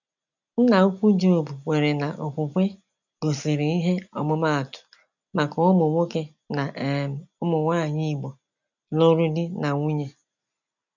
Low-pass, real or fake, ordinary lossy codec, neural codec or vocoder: 7.2 kHz; real; none; none